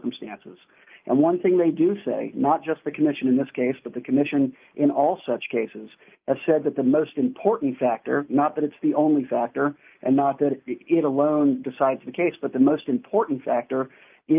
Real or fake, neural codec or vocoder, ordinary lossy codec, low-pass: real; none; Opus, 24 kbps; 3.6 kHz